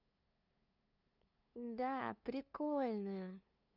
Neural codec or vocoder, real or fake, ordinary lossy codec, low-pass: codec, 16 kHz, 2 kbps, FunCodec, trained on LibriTTS, 25 frames a second; fake; none; 5.4 kHz